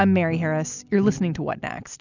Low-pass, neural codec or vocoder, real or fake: 7.2 kHz; none; real